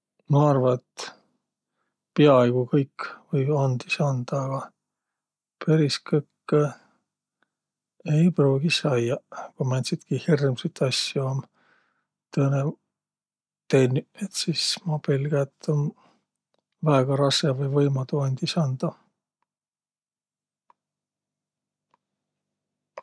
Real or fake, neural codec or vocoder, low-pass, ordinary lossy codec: real; none; none; none